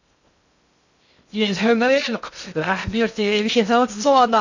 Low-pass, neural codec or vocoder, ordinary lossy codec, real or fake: 7.2 kHz; codec, 16 kHz in and 24 kHz out, 0.6 kbps, FocalCodec, streaming, 2048 codes; none; fake